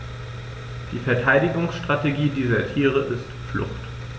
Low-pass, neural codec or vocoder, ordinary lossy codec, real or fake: none; none; none; real